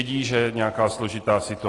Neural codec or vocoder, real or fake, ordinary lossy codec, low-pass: none; real; AAC, 32 kbps; 10.8 kHz